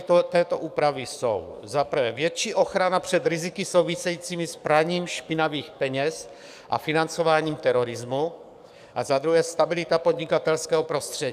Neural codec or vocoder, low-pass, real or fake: codec, 44.1 kHz, 7.8 kbps, DAC; 14.4 kHz; fake